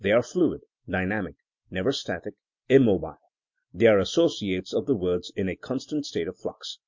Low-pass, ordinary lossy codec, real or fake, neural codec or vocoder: 7.2 kHz; MP3, 48 kbps; real; none